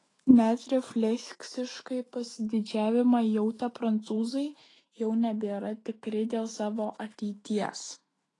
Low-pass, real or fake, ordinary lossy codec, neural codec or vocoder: 10.8 kHz; fake; AAC, 32 kbps; autoencoder, 48 kHz, 128 numbers a frame, DAC-VAE, trained on Japanese speech